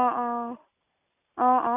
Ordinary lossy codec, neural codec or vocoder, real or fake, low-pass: none; none; real; 3.6 kHz